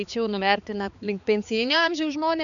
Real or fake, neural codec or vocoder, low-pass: fake; codec, 16 kHz, 4 kbps, X-Codec, HuBERT features, trained on balanced general audio; 7.2 kHz